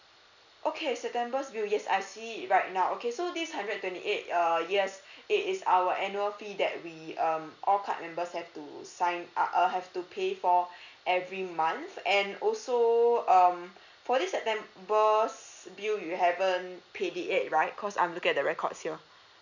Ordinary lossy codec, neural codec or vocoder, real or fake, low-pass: none; none; real; 7.2 kHz